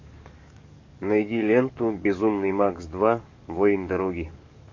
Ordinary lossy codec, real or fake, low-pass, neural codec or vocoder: AAC, 48 kbps; fake; 7.2 kHz; codec, 44.1 kHz, 7.8 kbps, DAC